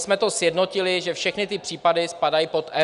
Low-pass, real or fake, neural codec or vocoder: 10.8 kHz; real; none